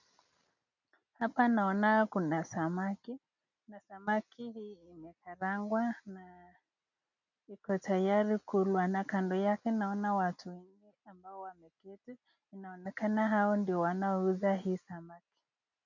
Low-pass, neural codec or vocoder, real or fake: 7.2 kHz; none; real